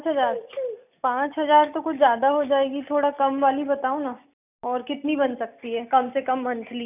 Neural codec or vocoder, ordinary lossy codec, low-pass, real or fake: none; none; 3.6 kHz; real